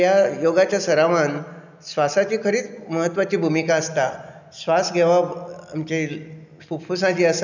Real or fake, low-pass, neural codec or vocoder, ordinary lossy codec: real; 7.2 kHz; none; none